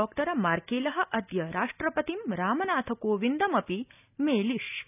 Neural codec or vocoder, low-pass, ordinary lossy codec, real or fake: none; 3.6 kHz; none; real